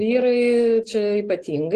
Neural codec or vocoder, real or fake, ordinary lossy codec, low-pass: none; real; Opus, 16 kbps; 10.8 kHz